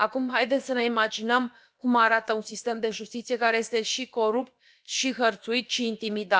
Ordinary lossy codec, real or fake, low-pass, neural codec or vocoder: none; fake; none; codec, 16 kHz, about 1 kbps, DyCAST, with the encoder's durations